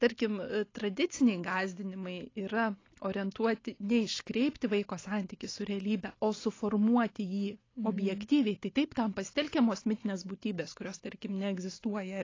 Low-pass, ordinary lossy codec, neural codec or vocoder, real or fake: 7.2 kHz; AAC, 32 kbps; none; real